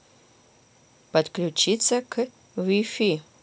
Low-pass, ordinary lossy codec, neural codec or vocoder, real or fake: none; none; none; real